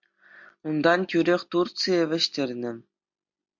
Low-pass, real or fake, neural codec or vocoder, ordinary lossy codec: 7.2 kHz; real; none; MP3, 64 kbps